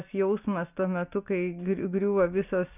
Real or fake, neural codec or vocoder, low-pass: real; none; 3.6 kHz